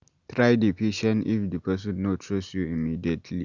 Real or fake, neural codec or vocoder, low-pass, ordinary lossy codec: real; none; 7.2 kHz; Opus, 64 kbps